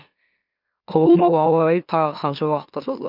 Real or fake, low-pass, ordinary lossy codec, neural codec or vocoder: fake; 5.4 kHz; none; autoencoder, 44.1 kHz, a latent of 192 numbers a frame, MeloTTS